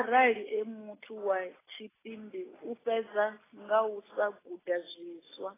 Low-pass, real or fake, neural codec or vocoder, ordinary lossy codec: 3.6 kHz; real; none; AAC, 16 kbps